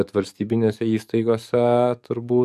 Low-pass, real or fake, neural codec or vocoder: 14.4 kHz; fake; codec, 44.1 kHz, 7.8 kbps, DAC